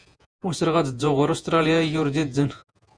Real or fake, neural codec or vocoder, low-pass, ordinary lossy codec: fake; vocoder, 48 kHz, 128 mel bands, Vocos; 9.9 kHz; Opus, 64 kbps